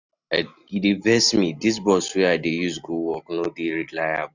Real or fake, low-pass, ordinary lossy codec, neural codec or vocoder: real; 7.2 kHz; none; none